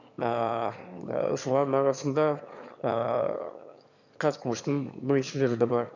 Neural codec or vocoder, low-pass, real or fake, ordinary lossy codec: autoencoder, 22.05 kHz, a latent of 192 numbers a frame, VITS, trained on one speaker; 7.2 kHz; fake; none